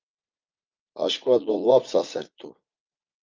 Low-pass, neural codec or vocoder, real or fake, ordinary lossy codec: 7.2 kHz; vocoder, 44.1 kHz, 80 mel bands, Vocos; fake; Opus, 32 kbps